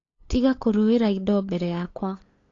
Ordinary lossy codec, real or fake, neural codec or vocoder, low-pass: AAC, 32 kbps; fake; codec, 16 kHz, 8 kbps, FunCodec, trained on LibriTTS, 25 frames a second; 7.2 kHz